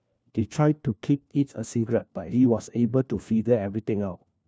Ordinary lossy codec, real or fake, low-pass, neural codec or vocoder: none; fake; none; codec, 16 kHz, 1 kbps, FunCodec, trained on LibriTTS, 50 frames a second